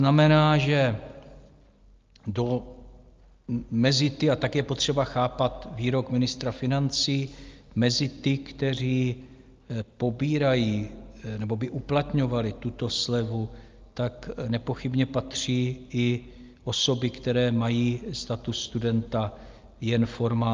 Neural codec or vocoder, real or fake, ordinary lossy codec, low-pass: none; real; Opus, 24 kbps; 7.2 kHz